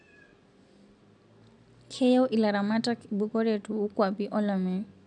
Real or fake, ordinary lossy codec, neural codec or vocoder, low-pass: real; none; none; 10.8 kHz